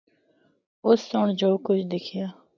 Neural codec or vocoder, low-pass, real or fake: none; 7.2 kHz; real